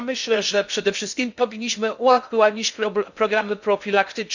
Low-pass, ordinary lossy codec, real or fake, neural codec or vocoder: 7.2 kHz; none; fake; codec, 16 kHz in and 24 kHz out, 0.6 kbps, FocalCodec, streaming, 2048 codes